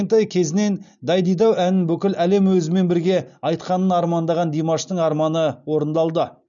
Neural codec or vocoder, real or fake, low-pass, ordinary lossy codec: none; real; 7.2 kHz; none